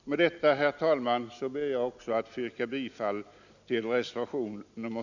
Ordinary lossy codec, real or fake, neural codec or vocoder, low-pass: none; real; none; 7.2 kHz